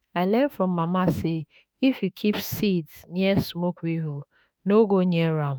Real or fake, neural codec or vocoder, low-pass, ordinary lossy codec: fake; autoencoder, 48 kHz, 32 numbers a frame, DAC-VAE, trained on Japanese speech; none; none